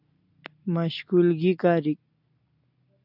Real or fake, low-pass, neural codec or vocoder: real; 5.4 kHz; none